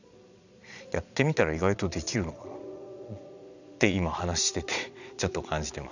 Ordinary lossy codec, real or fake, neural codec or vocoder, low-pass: none; fake; vocoder, 22.05 kHz, 80 mel bands, Vocos; 7.2 kHz